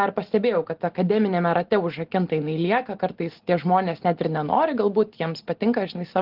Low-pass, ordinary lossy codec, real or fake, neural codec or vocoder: 5.4 kHz; Opus, 16 kbps; real; none